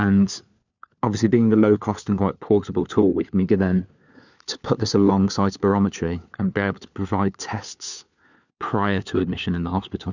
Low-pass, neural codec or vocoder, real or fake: 7.2 kHz; codec, 16 kHz, 4 kbps, FunCodec, trained on LibriTTS, 50 frames a second; fake